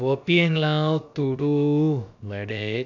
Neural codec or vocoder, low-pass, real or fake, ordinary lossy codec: codec, 16 kHz, about 1 kbps, DyCAST, with the encoder's durations; 7.2 kHz; fake; none